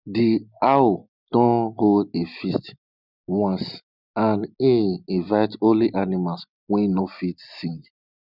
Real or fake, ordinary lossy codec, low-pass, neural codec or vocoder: real; none; 5.4 kHz; none